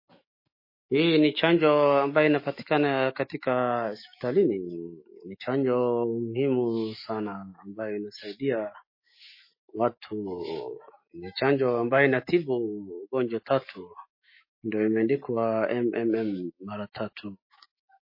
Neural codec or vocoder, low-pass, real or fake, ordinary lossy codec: autoencoder, 48 kHz, 128 numbers a frame, DAC-VAE, trained on Japanese speech; 5.4 kHz; fake; MP3, 24 kbps